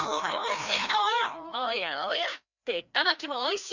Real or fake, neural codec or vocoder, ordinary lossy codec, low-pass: fake; codec, 16 kHz, 1 kbps, FreqCodec, larger model; none; 7.2 kHz